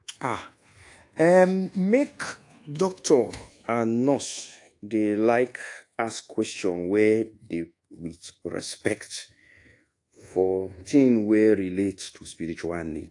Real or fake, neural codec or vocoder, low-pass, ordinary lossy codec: fake; codec, 24 kHz, 1.2 kbps, DualCodec; 10.8 kHz; AAC, 48 kbps